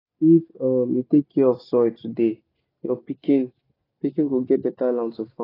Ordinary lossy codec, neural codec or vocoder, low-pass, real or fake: AAC, 24 kbps; none; 5.4 kHz; real